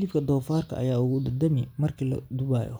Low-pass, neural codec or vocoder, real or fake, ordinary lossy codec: none; none; real; none